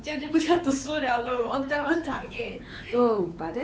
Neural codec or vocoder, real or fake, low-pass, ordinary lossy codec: codec, 16 kHz, 4 kbps, X-Codec, WavLM features, trained on Multilingual LibriSpeech; fake; none; none